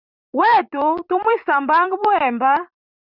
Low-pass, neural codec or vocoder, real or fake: 5.4 kHz; vocoder, 44.1 kHz, 128 mel bands every 256 samples, BigVGAN v2; fake